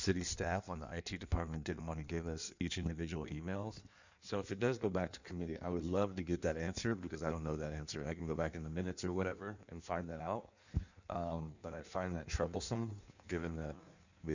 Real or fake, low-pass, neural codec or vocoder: fake; 7.2 kHz; codec, 16 kHz in and 24 kHz out, 1.1 kbps, FireRedTTS-2 codec